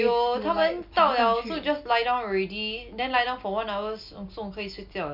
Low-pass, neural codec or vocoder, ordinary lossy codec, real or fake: 5.4 kHz; none; MP3, 48 kbps; real